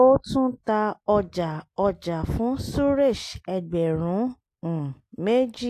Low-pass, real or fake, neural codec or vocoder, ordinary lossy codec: 14.4 kHz; real; none; AAC, 64 kbps